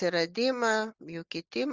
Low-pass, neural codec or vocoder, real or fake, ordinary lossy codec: 7.2 kHz; none; real; Opus, 32 kbps